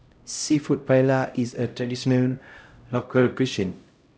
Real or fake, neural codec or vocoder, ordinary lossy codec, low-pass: fake; codec, 16 kHz, 0.5 kbps, X-Codec, HuBERT features, trained on LibriSpeech; none; none